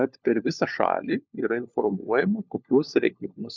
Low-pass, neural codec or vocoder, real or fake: 7.2 kHz; codec, 16 kHz, 4 kbps, FunCodec, trained on LibriTTS, 50 frames a second; fake